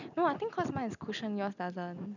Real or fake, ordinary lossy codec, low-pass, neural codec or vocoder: real; none; 7.2 kHz; none